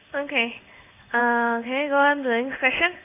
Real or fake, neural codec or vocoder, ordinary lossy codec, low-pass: fake; codec, 16 kHz in and 24 kHz out, 1 kbps, XY-Tokenizer; AAC, 32 kbps; 3.6 kHz